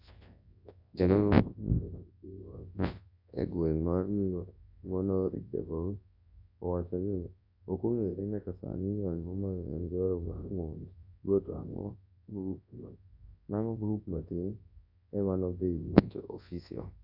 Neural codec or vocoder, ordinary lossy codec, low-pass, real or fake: codec, 24 kHz, 0.9 kbps, WavTokenizer, large speech release; AAC, 48 kbps; 5.4 kHz; fake